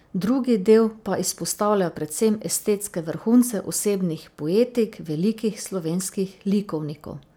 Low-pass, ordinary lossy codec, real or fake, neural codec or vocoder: none; none; real; none